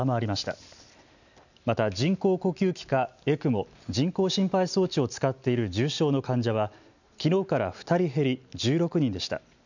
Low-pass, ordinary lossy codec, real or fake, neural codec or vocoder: 7.2 kHz; none; real; none